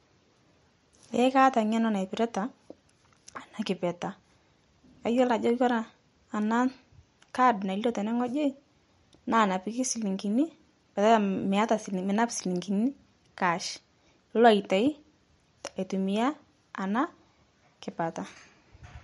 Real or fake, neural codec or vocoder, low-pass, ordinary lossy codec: real; none; 19.8 kHz; MP3, 48 kbps